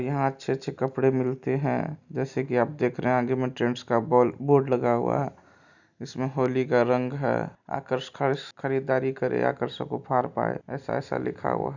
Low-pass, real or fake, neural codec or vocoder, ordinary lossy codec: 7.2 kHz; real; none; none